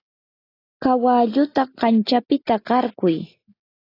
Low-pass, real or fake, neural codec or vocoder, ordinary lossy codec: 5.4 kHz; real; none; AAC, 24 kbps